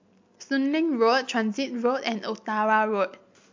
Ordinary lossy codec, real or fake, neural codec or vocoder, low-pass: MP3, 48 kbps; real; none; 7.2 kHz